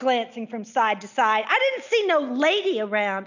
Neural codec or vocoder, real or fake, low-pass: none; real; 7.2 kHz